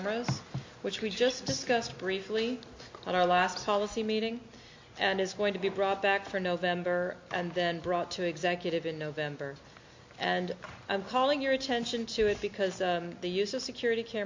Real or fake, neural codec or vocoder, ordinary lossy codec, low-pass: real; none; MP3, 48 kbps; 7.2 kHz